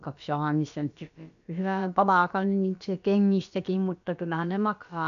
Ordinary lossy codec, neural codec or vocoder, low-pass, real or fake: none; codec, 16 kHz, about 1 kbps, DyCAST, with the encoder's durations; 7.2 kHz; fake